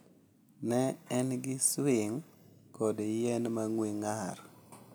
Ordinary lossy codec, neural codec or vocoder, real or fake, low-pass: none; none; real; none